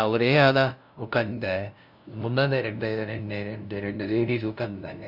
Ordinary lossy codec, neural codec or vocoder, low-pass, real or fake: none; codec, 16 kHz, 0.5 kbps, FunCodec, trained on LibriTTS, 25 frames a second; 5.4 kHz; fake